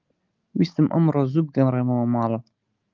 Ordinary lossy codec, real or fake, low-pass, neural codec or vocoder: Opus, 24 kbps; real; 7.2 kHz; none